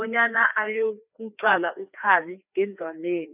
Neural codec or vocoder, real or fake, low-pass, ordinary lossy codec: codec, 16 kHz, 2 kbps, FreqCodec, larger model; fake; 3.6 kHz; none